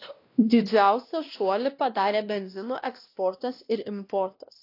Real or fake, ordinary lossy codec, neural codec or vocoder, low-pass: fake; AAC, 32 kbps; codec, 16 kHz, 1 kbps, X-Codec, WavLM features, trained on Multilingual LibriSpeech; 5.4 kHz